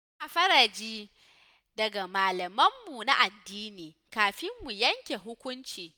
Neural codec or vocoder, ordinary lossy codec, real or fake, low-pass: none; none; real; none